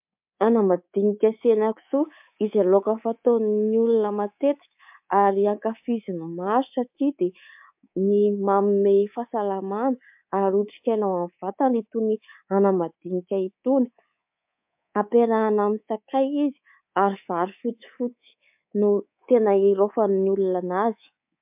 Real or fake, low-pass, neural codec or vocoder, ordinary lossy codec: fake; 3.6 kHz; codec, 24 kHz, 3.1 kbps, DualCodec; MP3, 32 kbps